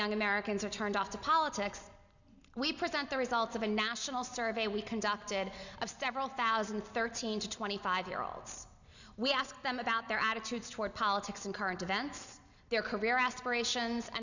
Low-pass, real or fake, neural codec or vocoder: 7.2 kHz; real; none